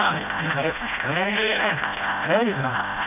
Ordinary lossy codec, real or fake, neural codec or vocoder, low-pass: none; fake; codec, 16 kHz, 0.5 kbps, FreqCodec, smaller model; 3.6 kHz